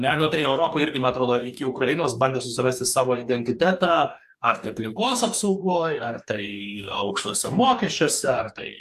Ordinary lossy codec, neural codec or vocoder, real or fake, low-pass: AAC, 96 kbps; codec, 44.1 kHz, 2.6 kbps, DAC; fake; 14.4 kHz